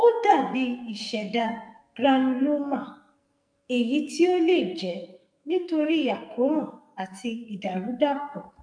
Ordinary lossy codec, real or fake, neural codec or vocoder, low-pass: MP3, 96 kbps; fake; codec, 44.1 kHz, 2.6 kbps, SNAC; 9.9 kHz